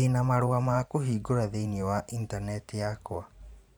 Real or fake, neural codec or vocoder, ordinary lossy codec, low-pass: fake; vocoder, 44.1 kHz, 128 mel bands every 512 samples, BigVGAN v2; none; none